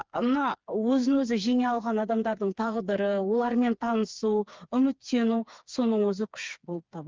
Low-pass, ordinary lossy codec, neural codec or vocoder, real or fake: 7.2 kHz; Opus, 24 kbps; codec, 16 kHz, 4 kbps, FreqCodec, smaller model; fake